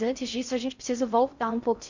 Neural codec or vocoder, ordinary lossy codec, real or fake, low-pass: codec, 16 kHz in and 24 kHz out, 0.6 kbps, FocalCodec, streaming, 4096 codes; Opus, 64 kbps; fake; 7.2 kHz